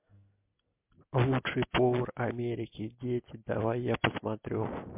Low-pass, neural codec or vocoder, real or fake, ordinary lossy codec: 3.6 kHz; none; real; MP3, 32 kbps